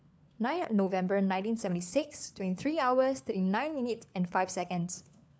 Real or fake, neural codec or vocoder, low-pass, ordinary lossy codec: fake; codec, 16 kHz, 4 kbps, FunCodec, trained on LibriTTS, 50 frames a second; none; none